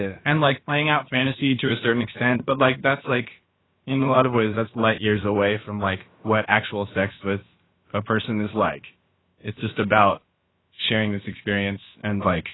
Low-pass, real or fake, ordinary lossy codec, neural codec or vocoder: 7.2 kHz; fake; AAC, 16 kbps; codec, 16 kHz, about 1 kbps, DyCAST, with the encoder's durations